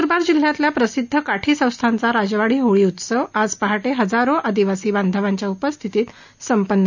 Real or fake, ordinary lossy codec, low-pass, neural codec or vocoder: real; none; 7.2 kHz; none